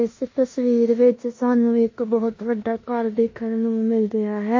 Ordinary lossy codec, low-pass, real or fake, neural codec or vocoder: MP3, 32 kbps; 7.2 kHz; fake; codec, 16 kHz in and 24 kHz out, 0.9 kbps, LongCat-Audio-Codec, four codebook decoder